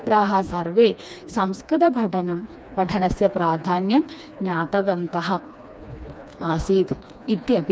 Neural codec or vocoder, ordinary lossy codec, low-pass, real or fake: codec, 16 kHz, 2 kbps, FreqCodec, smaller model; none; none; fake